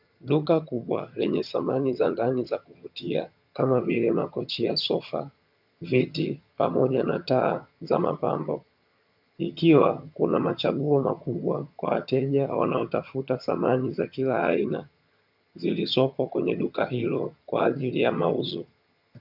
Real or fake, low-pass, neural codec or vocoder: fake; 5.4 kHz; vocoder, 22.05 kHz, 80 mel bands, HiFi-GAN